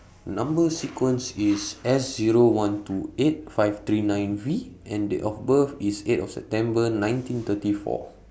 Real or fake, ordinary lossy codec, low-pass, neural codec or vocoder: real; none; none; none